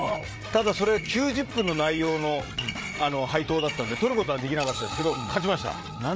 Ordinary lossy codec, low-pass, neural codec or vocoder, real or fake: none; none; codec, 16 kHz, 8 kbps, FreqCodec, larger model; fake